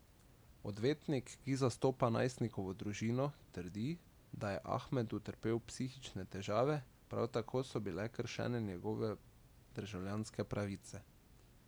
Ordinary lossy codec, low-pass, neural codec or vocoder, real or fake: none; none; none; real